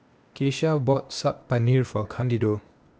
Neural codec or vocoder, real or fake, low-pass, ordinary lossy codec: codec, 16 kHz, 0.8 kbps, ZipCodec; fake; none; none